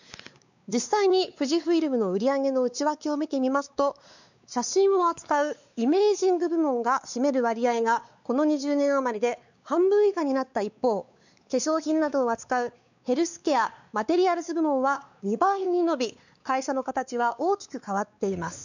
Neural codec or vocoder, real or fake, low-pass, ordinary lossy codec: codec, 16 kHz, 4 kbps, X-Codec, WavLM features, trained on Multilingual LibriSpeech; fake; 7.2 kHz; none